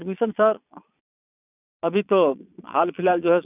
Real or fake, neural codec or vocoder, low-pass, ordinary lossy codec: fake; vocoder, 22.05 kHz, 80 mel bands, Vocos; 3.6 kHz; none